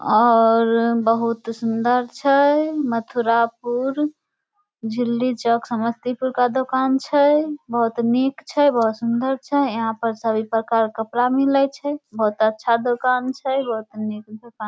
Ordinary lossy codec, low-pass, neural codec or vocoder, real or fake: none; none; none; real